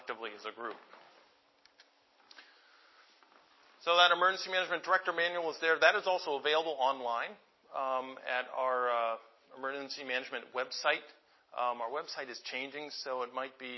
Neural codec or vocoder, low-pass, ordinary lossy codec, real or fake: none; 7.2 kHz; MP3, 24 kbps; real